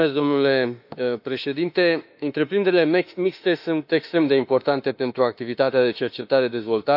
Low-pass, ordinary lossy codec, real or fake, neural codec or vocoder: 5.4 kHz; none; fake; autoencoder, 48 kHz, 32 numbers a frame, DAC-VAE, trained on Japanese speech